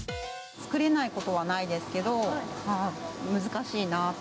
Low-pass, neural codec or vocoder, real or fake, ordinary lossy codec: none; none; real; none